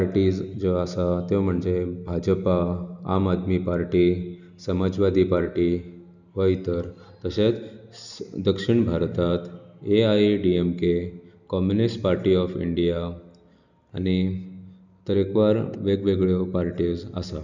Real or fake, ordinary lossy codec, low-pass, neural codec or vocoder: real; none; 7.2 kHz; none